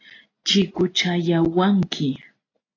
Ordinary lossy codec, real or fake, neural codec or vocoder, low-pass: AAC, 32 kbps; real; none; 7.2 kHz